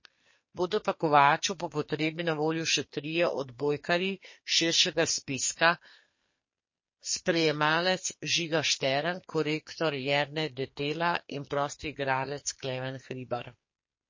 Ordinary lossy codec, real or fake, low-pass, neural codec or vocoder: MP3, 32 kbps; fake; 7.2 kHz; codec, 44.1 kHz, 2.6 kbps, SNAC